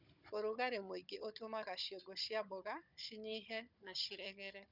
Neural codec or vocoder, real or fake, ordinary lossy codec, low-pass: codec, 16 kHz, 16 kbps, FunCodec, trained on Chinese and English, 50 frames a second; fake; none; 5.4 kHz